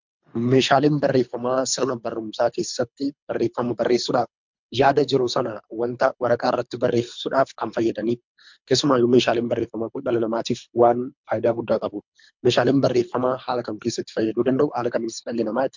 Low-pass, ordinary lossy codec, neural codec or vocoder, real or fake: 7.2 kHz; MP3, 64 kbps; codec, 24 kHz, 3 kbps, HILCodec; fake